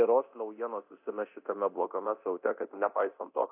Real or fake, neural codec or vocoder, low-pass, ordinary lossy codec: fake; codec, 24 kHz, 0.9 kbps, DualCodec; 3.6 kHz; AAC, 32 kbps